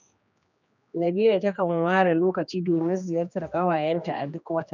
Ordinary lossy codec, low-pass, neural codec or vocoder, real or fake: none; 7.2 kHz; codec, 16 kHz, 2 kbps, X-Codec, HuBERT features, trained on general audio; fake